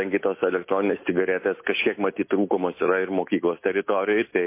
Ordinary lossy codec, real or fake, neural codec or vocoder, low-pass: MP3, 24 kbps; real; none; 3.6 kHz